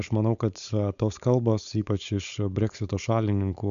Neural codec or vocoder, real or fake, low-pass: codec, 16 kHz, 4.8 kbps, FACodec; fake; 7.2 kHz